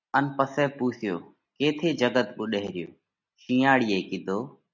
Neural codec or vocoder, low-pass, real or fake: none; 7.2 kHz; real